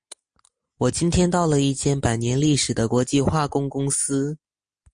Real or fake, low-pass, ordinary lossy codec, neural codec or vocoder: real; 9.9 kHz; MP3, 48 kbps; none